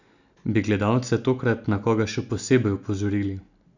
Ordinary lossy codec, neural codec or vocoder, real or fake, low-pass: none; none; real; 7.2 kHz